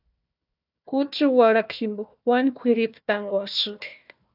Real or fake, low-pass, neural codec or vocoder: fake; 5.4 kHz; codec, 16 kHz, 1 kbps, FunCodec, trained on Chinese and English, 50 frames a second